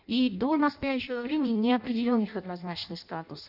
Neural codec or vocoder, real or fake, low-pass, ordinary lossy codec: codec, 16 kHz in and 24 kHz out, 0.6 kbps, FireRedTTS-2 codec; fake; 5.4 kHz; none